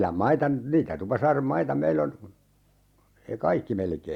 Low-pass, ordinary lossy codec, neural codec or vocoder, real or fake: 19.8 kHz; Opus, 64 kbps; none; real